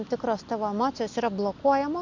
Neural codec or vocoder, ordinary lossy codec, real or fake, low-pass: none; MP3, 64 kbps; real; 7.2 kHz